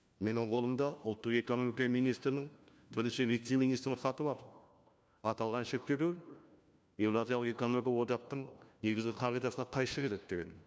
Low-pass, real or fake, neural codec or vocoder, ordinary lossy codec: none; fake; codec, 16 kHz, 1 kbps, FunCodec, trained on LibriTTS, 50 frames a second; none